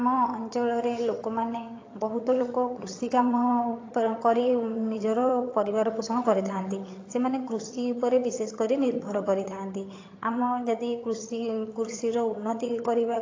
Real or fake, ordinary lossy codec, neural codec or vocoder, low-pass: fake; AAC, 32 kbps; vocoder, 22.05 kHz, 80 mel bands, HiFi-GAN; 7.2 kHz